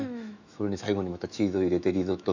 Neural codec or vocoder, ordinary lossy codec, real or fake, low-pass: autoencoder, 48 kHz, 128 numbers a frame, DAC-VAE, trained on Japanese speech; none; fake; 7.2 kHz